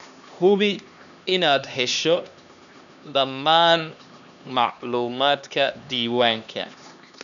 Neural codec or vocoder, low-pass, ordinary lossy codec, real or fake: codec, 16 kHz, 2 kbps, X-Codec, HuBERT features, trained on LibriSpeech; 7.2 kHz; none; fake